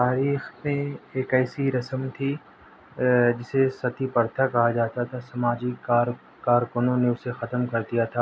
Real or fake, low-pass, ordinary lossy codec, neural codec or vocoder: real; none; none; none